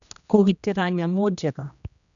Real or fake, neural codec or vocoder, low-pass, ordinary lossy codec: fake; codec, 16 kHz, 1 kbps, X-Codec, HuBERT features, trained on general audio; 7.2 kHz; none